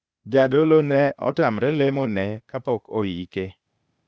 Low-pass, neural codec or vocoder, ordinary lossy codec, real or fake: none; codec, 16 kHz, 0.8 kbps, ZipCodec; none; fake